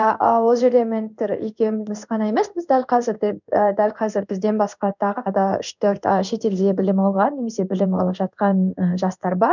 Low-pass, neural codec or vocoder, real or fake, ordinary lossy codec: 7.2 kHz; codec, 16 kHz in and 24 kHz out, 1 kbps, XY-Tokenizer; fake; none